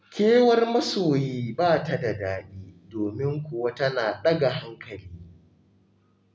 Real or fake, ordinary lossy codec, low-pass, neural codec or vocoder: real; none; none; none